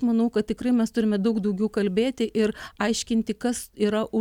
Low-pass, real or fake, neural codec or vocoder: 19.8 kHz; real; none